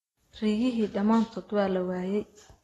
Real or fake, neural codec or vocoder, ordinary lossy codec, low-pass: real; none; AAC, 32 kbps; 14.4 kHz